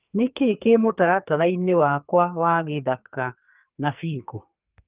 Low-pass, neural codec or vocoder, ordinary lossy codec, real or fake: 3.6 kHz; codec, 44.1 kHz, 2.6 kbps, SNAC; Opus, 32 kbps; fake